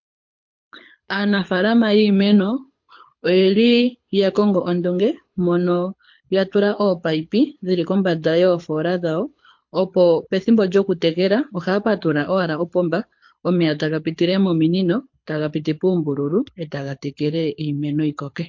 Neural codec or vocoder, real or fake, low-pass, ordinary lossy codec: codec, 24 kHz, 6 kbps, HILCodec; fake; 7.2 kHz; MP3, 48 kbps